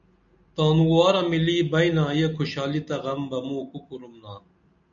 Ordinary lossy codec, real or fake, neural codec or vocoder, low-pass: MP3, 96 kbps; real; none; 7.2 kHz